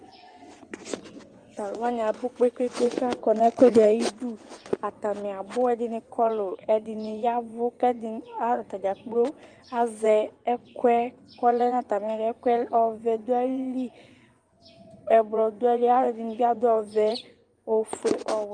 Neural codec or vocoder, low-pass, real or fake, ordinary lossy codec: vocoder, 24 kHz, 100 mel bands, Vocos; 9.9 kHz; fake; Opus, 32 kbps